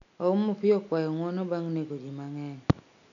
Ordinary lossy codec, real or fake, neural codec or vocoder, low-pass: none; real; none; 7.2 kHz